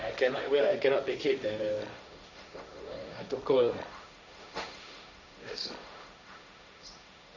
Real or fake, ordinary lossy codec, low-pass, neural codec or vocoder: fake; none; 7.2 kHz; codec, 16 kHz, 1.1 kbps, Voila-Tokenizer